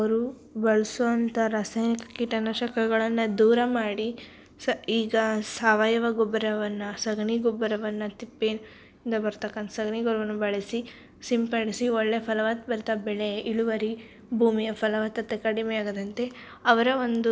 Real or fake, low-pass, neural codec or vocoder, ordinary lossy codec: real; none; none; none